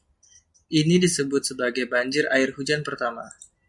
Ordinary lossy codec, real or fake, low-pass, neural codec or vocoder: MP3, 96 kbps; real; 10.8 kHz; none